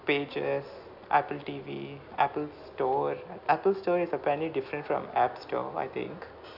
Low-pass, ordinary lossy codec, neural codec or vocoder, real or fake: 5.4 kHz; none; none; real